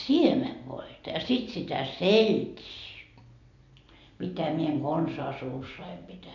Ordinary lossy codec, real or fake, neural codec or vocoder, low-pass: none; real; none; 7.2 kHz